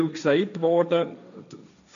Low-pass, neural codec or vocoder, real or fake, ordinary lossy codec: 7.2 kHz; codec, 16 kHz, 1.1 kbps, Voila-Tokenizer; fake; none